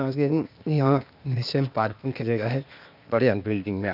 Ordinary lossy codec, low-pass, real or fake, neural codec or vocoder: none; 5.4 kHz; fake; codec, 16 kHz, 0.8 kbps, ZipCodec